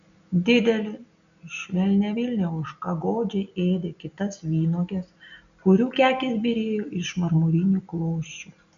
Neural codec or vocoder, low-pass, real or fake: none; 7.2 kHz; real